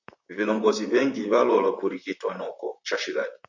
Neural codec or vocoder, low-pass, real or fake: vocoder, 44.1 kHz, 128 mel bands, Pupu-Vocoder; 7.2 kHz; fake